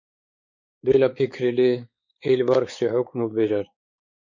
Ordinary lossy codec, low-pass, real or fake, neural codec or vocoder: MP3, 48 kbps; 7.2 kHz; fake; codec, 16 kHz, 4 kbps, X-Codec, WavLM features, trained on Multilingual LibriSpeech